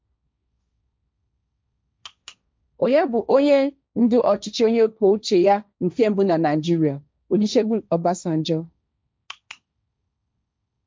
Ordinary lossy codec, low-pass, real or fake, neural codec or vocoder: none; none; fake; codec, 16 kHz, 1.1 kbps, Voila-Tokenizer